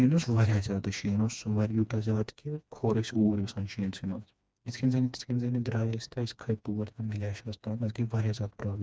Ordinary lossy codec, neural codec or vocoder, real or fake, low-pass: none; codec, 16 kHz, 2 kbps, FreqCodec, smaller model; fake; none